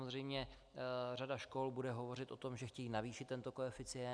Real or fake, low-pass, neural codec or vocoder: real; 9.9 kHz; none